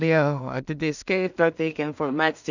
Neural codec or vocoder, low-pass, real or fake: codec, 16 kHz in and 24 kHz out, 0.4 kbps, LongCat-Audio-Codec, two codebook decoder; 7.2 kHz; fake